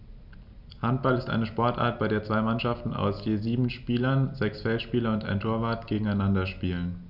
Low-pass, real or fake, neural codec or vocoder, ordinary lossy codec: 5.4 kHz; real; none; none